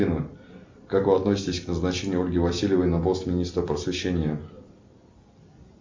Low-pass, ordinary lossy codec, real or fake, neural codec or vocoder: 7.2 kHz; MP3, 48 kbps; real; none